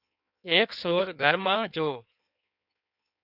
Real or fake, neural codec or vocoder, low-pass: fake; codec, 16 kHz in and 24 kHz out, 1.1 kbps, FireRedTTS-2 codec; 5.4 kHz